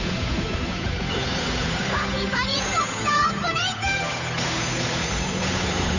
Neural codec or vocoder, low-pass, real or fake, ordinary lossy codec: codec, 16 kHz, 8 kbps, FunCodec, trained on Chinese and English, 25 frames a second; 7.2 kHz; fake; none